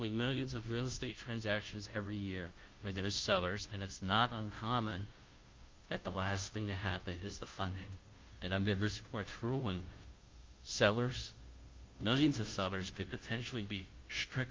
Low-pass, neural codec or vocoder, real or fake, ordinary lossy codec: 7.2 kHz; codec, 16 kHz, 0.5 kbps, FunCodec, trained on Chinese and English, 25 frames a second; fake; Opus, 24 kbps